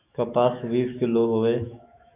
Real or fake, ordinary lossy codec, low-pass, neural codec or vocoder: fake; AAC, 32 kbps; 3.6 kHz; autoencoder, 48 kHz, 128 numbers a frame, DAC-VAE, trained on Japanese speech